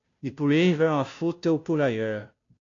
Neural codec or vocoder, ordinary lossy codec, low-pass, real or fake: codec, 16 kHz, 0.5 kbps, FunCodec, trained on Chinese and English, 25 frames a second; AAC, 48 kbps; 7.2 kHz; fake